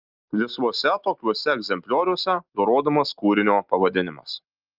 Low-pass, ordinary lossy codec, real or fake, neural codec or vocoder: 5.4 kHz; Opus, 32 kbps; real; none